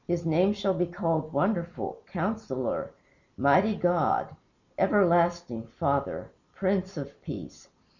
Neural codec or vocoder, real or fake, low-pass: none; real; 7.2 kHz